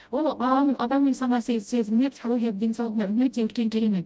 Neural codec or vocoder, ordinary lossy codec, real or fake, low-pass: codec, 16 kHz, 0.5 kbps, FreqCodec, smaller model; none; fake; none